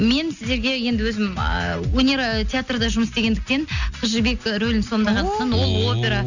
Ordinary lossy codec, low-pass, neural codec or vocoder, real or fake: none; 7.2 kHz; none; real